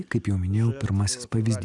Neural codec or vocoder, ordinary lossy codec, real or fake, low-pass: none; Opus, 64 kbps; real; 10.8 kHz